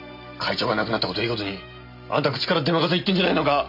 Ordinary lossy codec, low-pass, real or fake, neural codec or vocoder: MP3, 48 kbps; 5.4 kHz; real; none